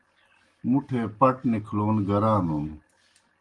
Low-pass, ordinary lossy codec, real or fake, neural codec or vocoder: 10.8 kHz; Opus, 24 kbps; real; none